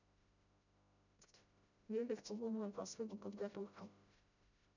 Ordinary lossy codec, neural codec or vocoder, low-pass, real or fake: none; codec, 16 kHz, 0.5 kbps, FreqCodec, smaller model; 7.2 kHz; fake